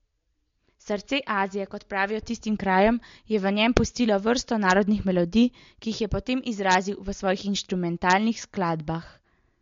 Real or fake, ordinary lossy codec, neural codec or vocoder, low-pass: real; MP3, 48 kbps; none; 7.2 kHz